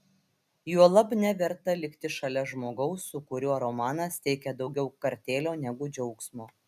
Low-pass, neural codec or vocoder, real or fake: 14.4 kHz; none; real